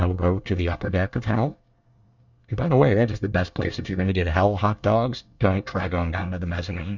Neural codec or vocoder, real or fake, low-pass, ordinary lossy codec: codec, 24 kHz, 1 kbps, SNAC; fake; 7.2 kHz; Opus, 64 kbps